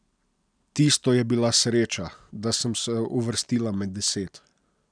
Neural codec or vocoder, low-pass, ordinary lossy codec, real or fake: none; 9.9 kHz; none; real